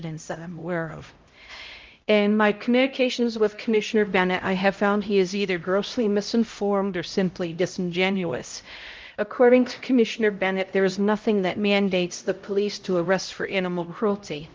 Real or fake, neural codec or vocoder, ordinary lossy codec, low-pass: fake; codec, 16 kHz, 0.5 kbps, X-Codec, HuBERT features, trained on LibriSpeech; Opus, 32 kbps; 7.2 kHz